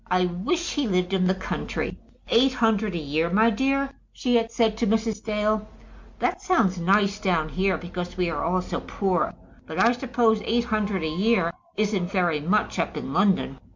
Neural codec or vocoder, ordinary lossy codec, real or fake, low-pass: none; MP3, 64 kbps; real; 7.2 kHz